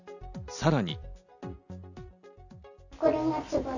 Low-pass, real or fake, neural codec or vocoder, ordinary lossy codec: 7.2 kHz; real; none; none